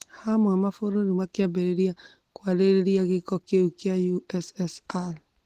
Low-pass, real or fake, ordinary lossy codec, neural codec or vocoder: 14.4 kHz; real; Opus, 16 kbps; none